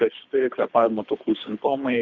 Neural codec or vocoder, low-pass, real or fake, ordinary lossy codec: codec, 24 kHz, 3 kbps, HILCodec; 7.2 kHz; fake; Opus, 64 kbps